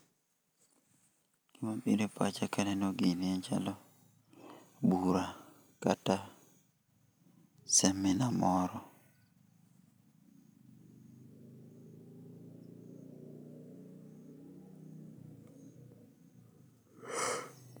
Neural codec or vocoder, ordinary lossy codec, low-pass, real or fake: none; none; none; real